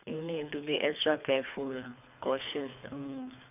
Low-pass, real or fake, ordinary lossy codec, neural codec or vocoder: 3.6 kHz; fake; none; codec, 24 kHz, 3 kbps, HILCodec